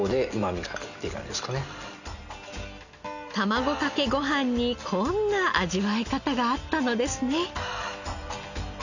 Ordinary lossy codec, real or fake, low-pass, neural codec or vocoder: none; real; 7.2 kHz; none